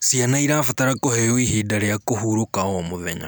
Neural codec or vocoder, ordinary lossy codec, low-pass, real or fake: none; none; none; real